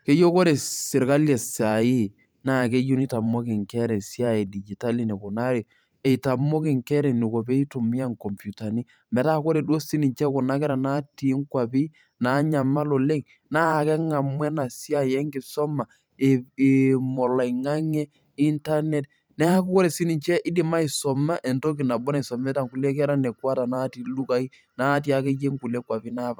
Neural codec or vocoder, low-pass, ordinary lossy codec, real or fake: vocoder, 44.1 kHz, 128 mel bands every 512 samples, BigVGAN v2; none; none; fake